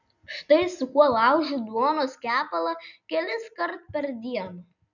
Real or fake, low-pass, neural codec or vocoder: real; 7.2 kHz; none